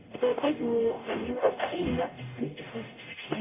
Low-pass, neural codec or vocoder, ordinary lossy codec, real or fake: 3.6 kHz; codec, 44.1 kHz, 0.9 kbps, DAC; MP3, 24 kbps; fake